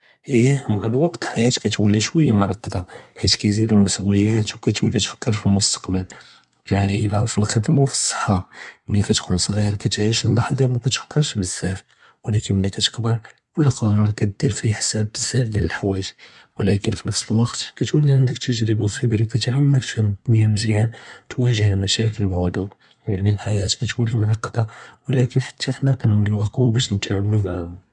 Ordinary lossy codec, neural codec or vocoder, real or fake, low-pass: none; codec, 24 kHz, 1 kbps, SNAC; fake; 10.8 kHz